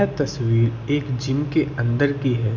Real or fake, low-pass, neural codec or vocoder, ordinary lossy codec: real; 7.2 kHz; none; none